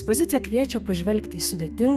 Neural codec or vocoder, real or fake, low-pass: codec, 32 kHz, 1.9 kbps, SNAC; fake; 14.4 kHz